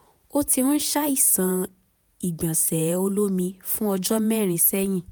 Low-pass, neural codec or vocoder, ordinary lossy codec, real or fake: none; vocoder, 48 kHz, 128 mel bands, Vocos; none; fake